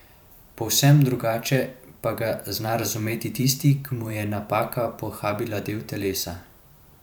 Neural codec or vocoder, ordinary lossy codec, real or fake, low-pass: vocoder, 44.1 kHz, 128 mel bands every 512 samples, BigVGAN v2; none; fake; none